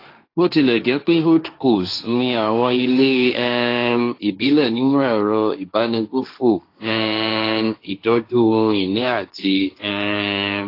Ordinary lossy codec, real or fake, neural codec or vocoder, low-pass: AAC, 32 kbps; fake; codec, 16 kHz, 1.1 kbps, Voila-Tokenizer; 5.4 kHz